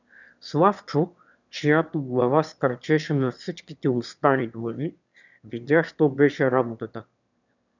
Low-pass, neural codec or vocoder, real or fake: 7.2 kHz; autoencoder, 22.05 kHz, a latent of 192 numbers a frame, VITS, trained on one speaker; fake